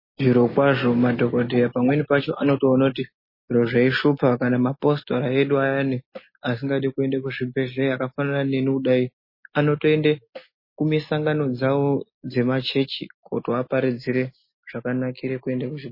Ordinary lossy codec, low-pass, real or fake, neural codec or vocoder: MP3, 24 kbps; 5.4 kHz; real; none